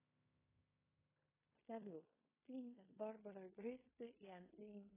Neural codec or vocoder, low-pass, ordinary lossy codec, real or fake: codec, 16 kHz in and 24 kHz out, 0.9 kbps, LongCat-Audio-Codec, fine tuned four codebook decoder; 3.6 kHz; AAC, 16 kbps; fake